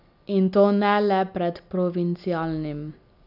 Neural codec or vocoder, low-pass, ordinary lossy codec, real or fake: none; 5.4 kHz; none; real